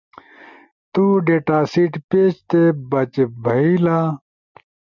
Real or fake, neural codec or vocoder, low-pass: real; none; 7.2 kHz